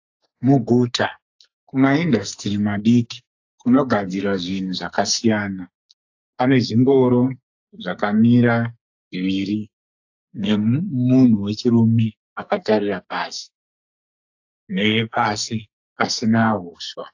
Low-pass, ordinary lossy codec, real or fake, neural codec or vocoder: 7.2 kHz; AAC, 48 kbps; fake; codec, 44.1 kHz, 2.6 kbps, SNAC